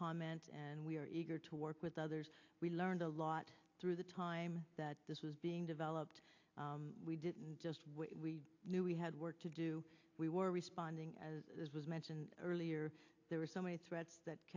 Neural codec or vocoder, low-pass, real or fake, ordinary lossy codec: none; 7.2 kHz; real; AAC, 48 kbps